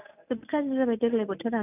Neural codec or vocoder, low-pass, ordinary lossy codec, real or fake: none; 3.6 kHz; none; real